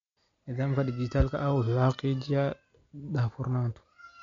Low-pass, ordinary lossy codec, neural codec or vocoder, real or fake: 7.2 kHz; MP3, 48 kbps; none; real